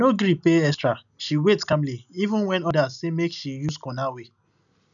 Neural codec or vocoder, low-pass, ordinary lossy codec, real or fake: none; 7.2 kHz; none; real